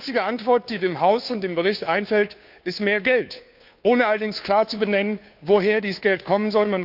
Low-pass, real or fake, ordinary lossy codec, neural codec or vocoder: 5.4 kHz; fake; none; codec, 16 kHz, 2 kbps, FunCodec, trained on Chinese and English, 25 frames a second